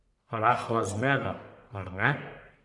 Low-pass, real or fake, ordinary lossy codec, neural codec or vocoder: 10.8 kHz; fake; none; codec, 44.1 kHz, 1.7 kbps, Pupu-Codec